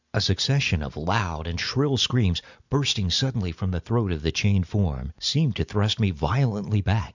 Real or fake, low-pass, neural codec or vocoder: real; 7.2 kHz; none